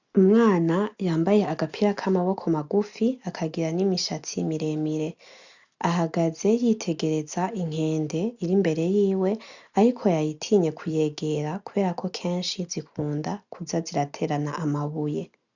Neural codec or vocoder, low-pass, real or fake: none; 7.2 kHz; real